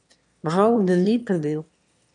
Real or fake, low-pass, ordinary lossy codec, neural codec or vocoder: fake; 9.9 kHz; MP3, 64 kbps; autoencoder, 22.05 kHz, a latent of 192 numbers a frame, VITS, trained on one speaker